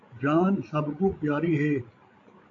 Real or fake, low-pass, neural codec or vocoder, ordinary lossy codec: fake; 7.2 kHz; codec, 16 kHz, 16 kbps, FreqCodec, larger model; AAC, 48 kbps